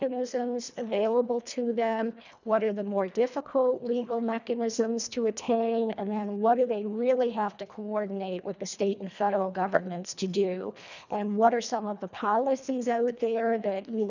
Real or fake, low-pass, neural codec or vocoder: fake; 7.2 kHz; codec, 24 kHz, 1.5 kbps, HILCodec